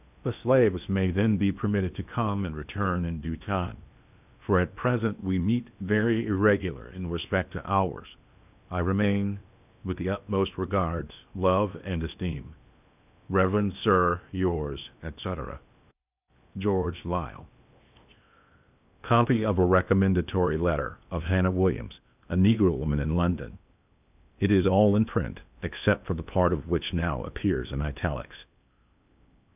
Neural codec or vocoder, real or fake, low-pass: codec, 16 kHz in and 24 kHz out, 0.8 kbps, FocalCodec, streaming, 65536 codes; fake; 3.6 kHz